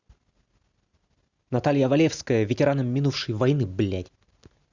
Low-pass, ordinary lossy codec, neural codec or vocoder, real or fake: 7.2 kHz; Opus, 64 kbps; none; real